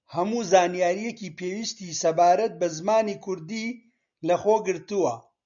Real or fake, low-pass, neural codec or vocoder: real; 7.2 kHz; none